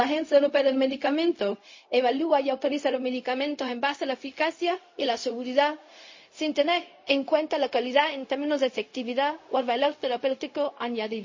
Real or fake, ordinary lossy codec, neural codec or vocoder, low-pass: fake; MP3, 32 kbps; codec, 16 kHz, 0.4 kbps, LongCat-Audio-Codec; 7.2 kHz